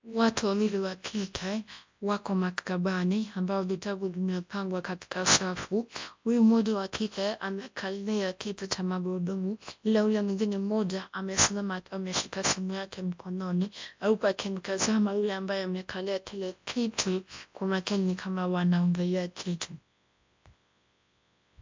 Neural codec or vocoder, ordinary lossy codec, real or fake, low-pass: codec, 24 kHz, 0.9 kbps, WavTokenizer, large speech release; MP3, 64 kbps; fake; 7.2 kHz